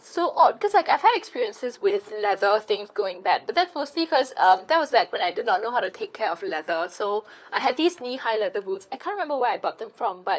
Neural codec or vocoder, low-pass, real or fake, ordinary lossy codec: codec, 16 kHz, 4 kbps, FunCodec, trained on LibriTTS, 50 frames a second; none; fake; none